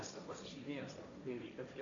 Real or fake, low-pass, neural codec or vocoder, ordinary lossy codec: fake; 7.2 kHz; codec, 16 kHz, 1.1 kbps, Voila-Tokenizer; AAC, 48 kbps